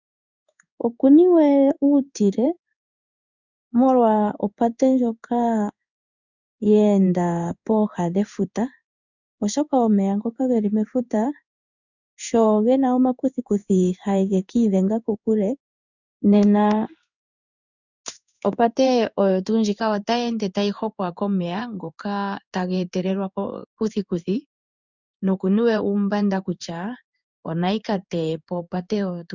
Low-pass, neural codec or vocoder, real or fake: 7.2 kHz; codec, 16 kHz in and 24 kHz out, 1 kbps, XY-Tokenizer; fake